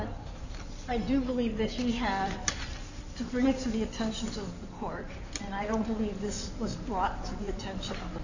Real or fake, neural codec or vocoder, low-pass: fake; codec, 16 kHz in and 24 kHz out, 2.2 kbps, FireRedTTS-2 codec; 7.2 kHz